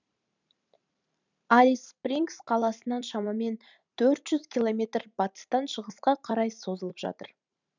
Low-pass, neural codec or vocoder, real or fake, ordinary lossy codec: 7.2 kHz; vocoder, 44.1 kHz, 128 mel bands every 512 samples, BigVGAN v2; fake; none